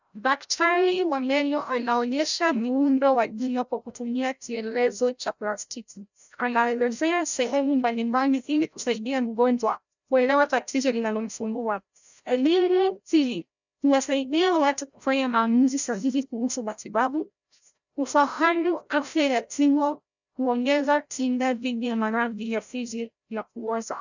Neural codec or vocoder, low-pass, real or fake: codec, 16 kHz, 0.5 kbps, FreqCodec, larger model; 7.2 kHz; fake